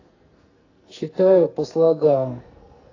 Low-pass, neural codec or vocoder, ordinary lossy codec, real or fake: 7.2 kHz; codec, 44.1 kHz, 2.6 kbps, SNAC; AAC, 32 kbps; fake